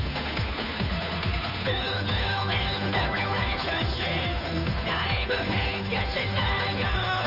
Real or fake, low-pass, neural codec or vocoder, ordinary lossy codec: fake; 5.4 kHz; codec, 16 kHz, 2 kbps, FunCodec, trained on Chinese and English, 25 frames a second; AAC, 48 kbps